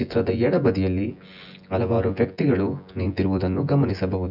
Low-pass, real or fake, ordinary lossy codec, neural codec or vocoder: 5.4 kHz; fake; none; vocoder, 24 kHz, 100 mel bands, Vocos